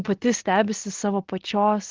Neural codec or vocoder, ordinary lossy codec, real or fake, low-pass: none; Opus, 16 kbps; real; 7.2 kHz